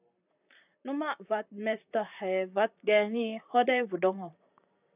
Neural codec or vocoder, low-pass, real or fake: vocoder, 44.1 kHz, 128 mel bands, Pupu-Vocoder; 3.6 kHz; fake